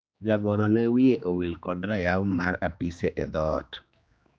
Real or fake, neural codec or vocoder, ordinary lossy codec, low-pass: fake; codec, 16 kHz, 2 kbps, X-Codec, HuBERT features, trained on general audio; none; none